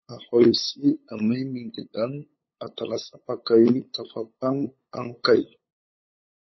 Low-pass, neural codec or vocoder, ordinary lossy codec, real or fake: 7.2 kHz; codec, 16 kHz, 8 kbps, FunCodec, trained on LibriTTS, 25 frames a second; MP3, 24 kbps; fake